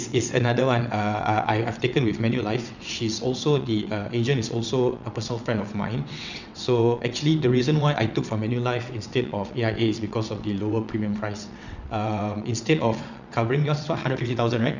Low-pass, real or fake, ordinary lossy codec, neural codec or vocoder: 7.2 kHz; fake; none; vocoder, 22.05 kHz, 80 mel bands, WaveNeXt